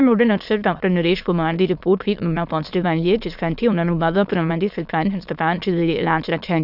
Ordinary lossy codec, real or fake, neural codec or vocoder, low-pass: none; fake; autoencoder, 22.05 kHz, a latent of 192 numbers a frame, VITS, trained on many speakers; 5.4 kHz